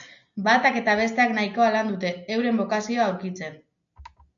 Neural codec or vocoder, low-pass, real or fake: none; 7.2 kHz; real